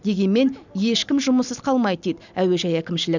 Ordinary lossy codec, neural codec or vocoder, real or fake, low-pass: none; none; real; 7.2 kHz